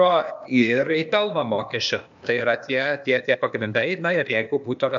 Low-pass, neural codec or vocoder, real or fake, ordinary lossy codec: 7.2 kHz; codec, 16 kHz, 0.8 kbps, ZipCodec; fake; MP3, 64 kbps